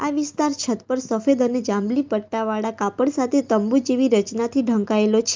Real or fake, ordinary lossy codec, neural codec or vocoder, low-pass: real; Opus, 24 kbps; none; 7.2 kHz